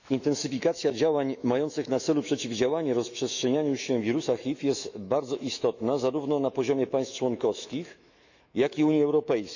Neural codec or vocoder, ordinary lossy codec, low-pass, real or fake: autoencoder, 48 kHz, 128 numbers a frame, DAC-VAE, trained on Japanese speech; none; 7.2 kHz; fake